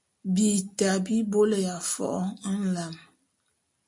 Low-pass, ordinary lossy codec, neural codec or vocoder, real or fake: 10.8 kHz; MP3, 48 kbps; none; real